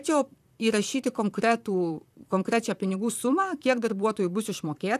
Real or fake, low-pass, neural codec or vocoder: fake; 14.4 kHz; vocoder, 44.1 kHz, 128 mel bands, Pupu-Vocoder